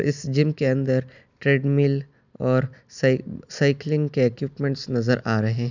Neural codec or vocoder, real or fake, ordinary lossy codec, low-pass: none; real; none; 7.2 kHz